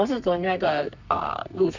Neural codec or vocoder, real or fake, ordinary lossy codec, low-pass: codec, 32 kHz, 1.9 kbps, SNAC; fake; none; 7.2 kHz